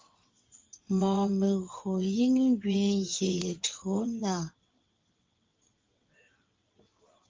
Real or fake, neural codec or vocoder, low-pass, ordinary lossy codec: fake; vocoder, 22.05 kHz, 80 mel bands, WaveNeXt; 7.2 kHz; Opus, 32 kbps